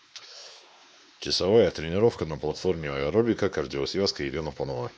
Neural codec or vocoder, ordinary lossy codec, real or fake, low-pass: codec, 16 kHz, 2 kbps, X-Codec, WavLM features, trained on Multilingual LibriSpeech; none; fake; none